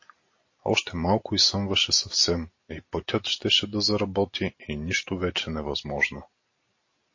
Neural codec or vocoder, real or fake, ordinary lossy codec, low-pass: none; real; MP3, 32 kbps; 7.2 kHz